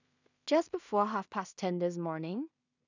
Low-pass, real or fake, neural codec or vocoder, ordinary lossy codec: 7.2 kHz; fake; codec, 16 kHz in and 24 kHz out, 0.4 kbps, LongCat-Audio-Codec, two codebook decoder; none